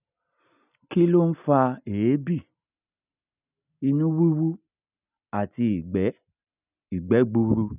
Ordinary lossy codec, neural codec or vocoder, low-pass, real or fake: none; none; 3.6 kHz; real